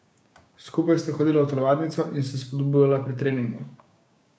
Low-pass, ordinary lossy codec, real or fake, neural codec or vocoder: none; none; fake; codec, 16 kHz, 6 kbps, DAC